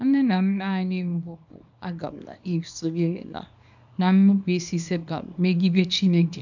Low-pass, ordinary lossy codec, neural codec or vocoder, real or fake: 7.2 kHz; none; codec, 24 kHz, 0.9 kbps, WavTokenizer, small release; fake